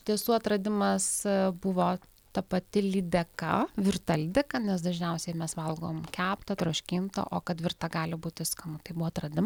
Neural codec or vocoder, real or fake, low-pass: none; real; 19.8 kHz